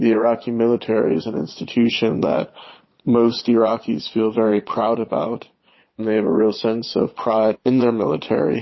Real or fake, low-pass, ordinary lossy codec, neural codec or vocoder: fake; 7.2 kHz; MP3, 24 kbps; vocoder, 22.05 kHz, 80 mel bands, Vocos